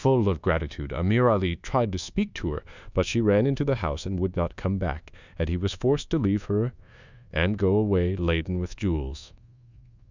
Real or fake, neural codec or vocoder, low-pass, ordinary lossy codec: fake; codec, 24 kHz, 1.2 kbps, DualCodec; 7.2 kHz; Opus, 64 kbps